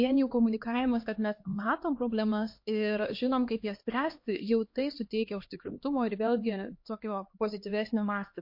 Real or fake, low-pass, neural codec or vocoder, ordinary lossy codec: fake; 5.4 kHz; codec, 16 kHz, 2 kbps, X-Codec, HuBERT features, trained on LibriSpeech; MP3, 32 kbps